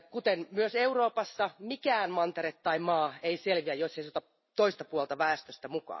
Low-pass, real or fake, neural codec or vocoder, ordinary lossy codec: 7.2 kHz; real; none; MP3, 24 kbps